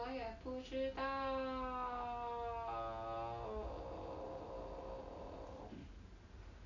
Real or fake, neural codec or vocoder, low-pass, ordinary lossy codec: real; none; 7.2 kHz; none